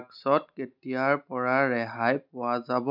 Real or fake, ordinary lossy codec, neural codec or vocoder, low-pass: real; none; none; 5.4 kHz